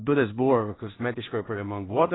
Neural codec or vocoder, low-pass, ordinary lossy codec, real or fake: codec, 16 kHz in and 24 kHz out, 0.4 kbps, LongCat-Audio-Codec, two codebook decoder; 7.2 kHz; AAC, 16 kbps; fake